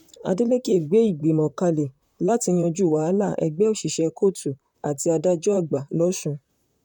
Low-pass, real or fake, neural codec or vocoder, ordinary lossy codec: 19.8 kHz; fake; vocoder, 44.1 kHz, 128 mel bands, Pupu-Vocoder; none